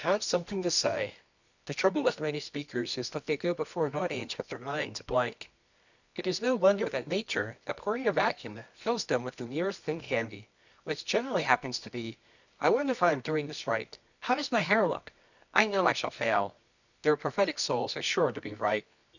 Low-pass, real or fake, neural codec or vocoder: 7.2 kHz; fake; codec, 24 kHz, 0.9 kbps, WavTokenizer, medium music audio release